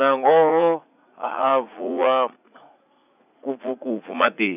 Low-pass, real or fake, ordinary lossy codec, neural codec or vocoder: 3.6 kHz; fake; none; vocoder, 44.1 kHz, 80 mel bands, Vocos